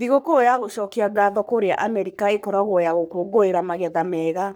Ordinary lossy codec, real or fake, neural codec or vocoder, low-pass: none; fake; codec, 44.1 kHz, 3.4 kbps, Pupu-Codec; none